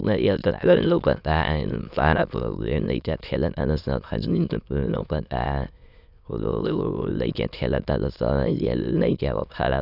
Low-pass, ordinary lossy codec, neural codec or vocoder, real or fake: 5.4 kHz; none; autoencoder, 22.05 kHz, a latent of 192 numbers a frame, VITS, trained on many speakers; fake